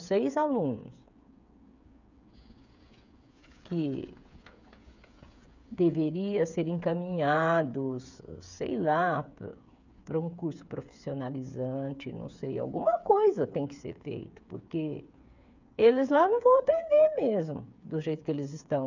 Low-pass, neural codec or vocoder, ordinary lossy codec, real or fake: 7.2 kHz; codec, 16 kHz, 8 kbps, FreqCodec, smaller model; none; fake